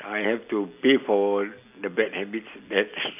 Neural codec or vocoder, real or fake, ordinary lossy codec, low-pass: none; real; AAC, 32 kbps; 3.6 kHz